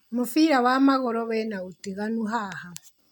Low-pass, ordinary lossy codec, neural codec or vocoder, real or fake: 19.8 kHz; none; none; real